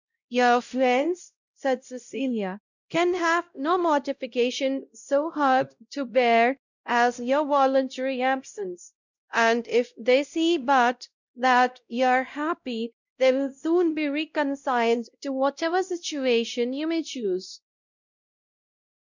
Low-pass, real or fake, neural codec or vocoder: 7.2 kHz; fake; codec, 16 kHz, 0.5 kbps, X-Codec, WavLM features, trained on Multilingual LibriSpeech